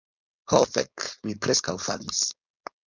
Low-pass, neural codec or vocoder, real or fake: 7.2 kHz; codec, 16 kHz, 4.8 kbps, FACodec; fake